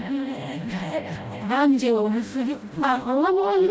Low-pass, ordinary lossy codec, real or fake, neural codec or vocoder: none; none; fake; codec, 16 kHz, 0.5 kbps, FreqCodec, smaller model